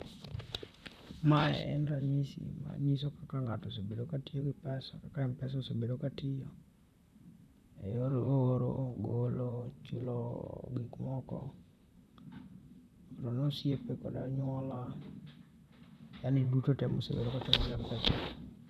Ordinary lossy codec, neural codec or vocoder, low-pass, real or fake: none; vocoder, 44.1 kHz, 128 mel bands, Pupu-Vocoder; 14.4 kHz; fake